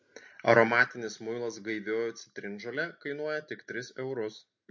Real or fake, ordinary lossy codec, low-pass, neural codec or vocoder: real; MP3, 48 kbps; 7.2 kHz; none